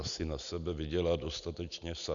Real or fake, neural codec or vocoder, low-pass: real; none; 7.2 kHz